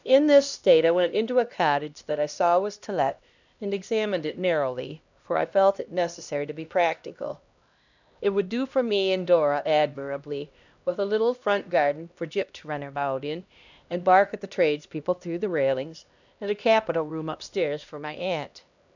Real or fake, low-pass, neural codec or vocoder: fake; 7.2 kHz; codec, 16 kHz, 1 kbps, X-Codec, HuBERT features, trained on LibriSpeech